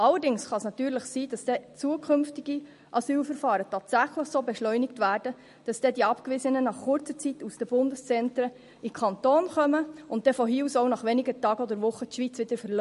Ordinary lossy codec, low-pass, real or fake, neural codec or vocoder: MP3, 48 kbps; 14.4 kHz; real; none